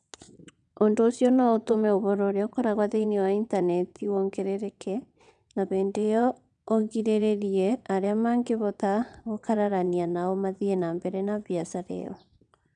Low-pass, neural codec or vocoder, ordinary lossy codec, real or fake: 9.9 kHz; vocoder, 22.05 kHz, 80 mel bands, WaveNeXt; none; fake